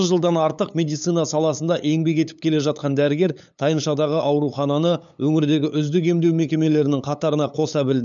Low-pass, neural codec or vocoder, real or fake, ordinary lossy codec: 7.2 kHz; codec, 16 kHz, 8 kbps, FunCodec, trained on LibriTTS, 25 frames a second; fake; none